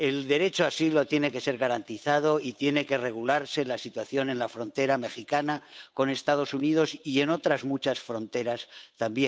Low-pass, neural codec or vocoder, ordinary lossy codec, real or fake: none; codec, 16 kHz, 8 kbps, FunCodec, trained on Chinese and English, 25 frames a second; none; fake